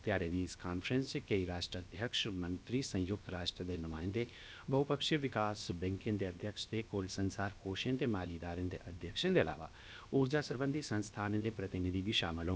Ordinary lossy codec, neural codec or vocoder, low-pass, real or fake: none; codec, 16 kHz, about 1 kbps, DyCAST, with the encoder's durations; none; fake